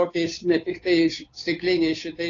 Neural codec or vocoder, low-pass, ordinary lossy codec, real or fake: codec, 16 kHz, 2 kbps, FunCodec, trained on Chinese and English, 25 frames a second; 7.2 kHz; AAC, 32 kbps; fake